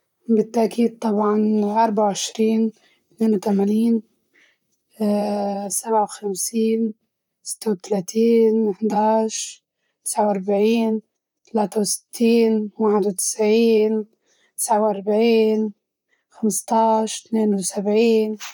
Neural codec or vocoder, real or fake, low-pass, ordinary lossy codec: vocoder, 44.1 kHz, 128 mel bands, Pupu-Vocoder; fake; 19.8 kHz; none